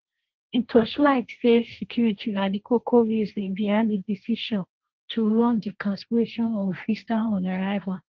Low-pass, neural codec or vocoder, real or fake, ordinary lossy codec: 7.2 kHz; codec, 16 kHz, 1.1 kbps, Voila-Tokenizer; fake; Opus, 24 kbps